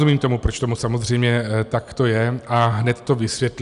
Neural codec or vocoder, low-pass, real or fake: none; 10.8 kHz; real